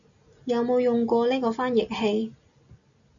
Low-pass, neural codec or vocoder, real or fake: 7.2 kHz; none; real